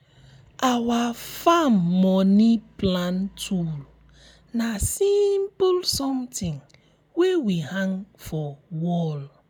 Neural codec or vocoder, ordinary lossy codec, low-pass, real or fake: none; none; none; real